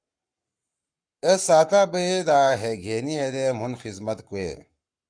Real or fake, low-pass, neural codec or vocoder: fake; 9.9 kHz; codec, 44.1 kHz, 7.8 kbps, Pupu-Codec